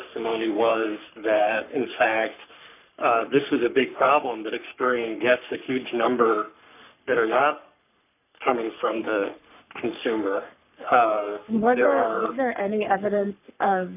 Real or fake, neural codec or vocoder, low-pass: fake; codec, 44.1 kHz, 3.4 kbps, Pupu-Codec; 3.6 kHz